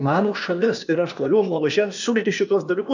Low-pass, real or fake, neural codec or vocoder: 7.2 kHz; fake; codec, 16 kHz, 0.8 kbps, ZipCodec